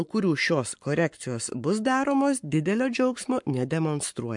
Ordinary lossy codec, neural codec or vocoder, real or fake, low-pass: MP3, 64 kbps; codec, 44.1 kHz, 7.8 kbps, Pupu-Codec; fake; 10.8 kHz